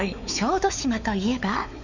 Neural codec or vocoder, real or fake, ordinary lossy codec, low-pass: codec, 16 kHz, 4 kbps, X-Codec, WavLM features, trained on Multilingual LibriSpeech; fake; none; 7.2 kHz